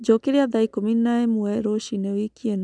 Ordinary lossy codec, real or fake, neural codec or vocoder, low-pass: none; real; none; 9.9 kHz